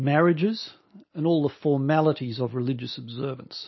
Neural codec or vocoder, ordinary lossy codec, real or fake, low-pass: none; MP3, 24 kbps; real; 7.2 kHz